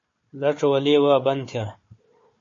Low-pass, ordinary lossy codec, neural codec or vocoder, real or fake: 7.2 kHz; MP3, 32 kbps; codec, 16 kHz, 4 kbps, FunCodec, trained on Chinese and English, 50 frames a second; fake